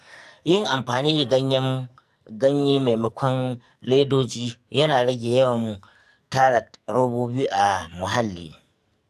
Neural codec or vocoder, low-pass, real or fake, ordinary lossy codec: codec, 44.1 kHz, 2.6 kbps, SNAC; 14.4 kHz; fake; none